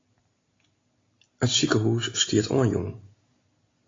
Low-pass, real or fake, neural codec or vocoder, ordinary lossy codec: 7.2 kHz; real; none; AAC, 32 kbps